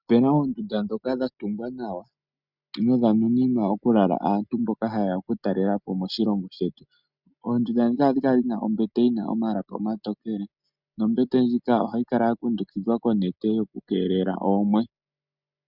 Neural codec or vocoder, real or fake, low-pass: none; real; 5.4 kHz